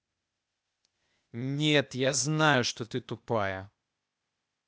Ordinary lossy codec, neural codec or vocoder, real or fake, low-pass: none; codec, 16 kHz, 0.8 kbps, ZipCodec; fake; none